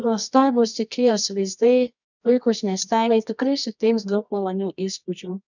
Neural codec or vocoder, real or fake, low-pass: codec, 24 kHz, 0.9 kbps, WavTokenizer, medium music audio release; fake; 7.2 kHz